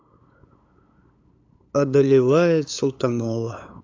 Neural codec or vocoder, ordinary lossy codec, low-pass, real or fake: codec, 16 kHz, 8 kbps, FunCodec, trained on LibriTTS, 25 frames a second; AAC, 48 kbps; 7.2 kHz; fake